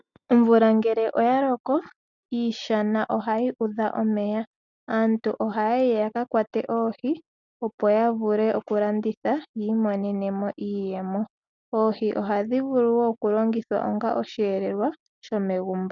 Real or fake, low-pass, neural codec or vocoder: real; 7.2 kHz; none